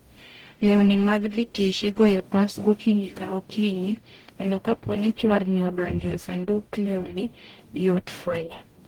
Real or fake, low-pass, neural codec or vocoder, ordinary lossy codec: fake; 19.8 kHz; codec, 44.1 kHz, 0.9 kbps, DAC; Opus, 24 kbps